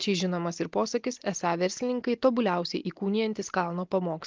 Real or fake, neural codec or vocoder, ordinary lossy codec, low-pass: real; none; Opus, 32 kbps; 7.2 kHz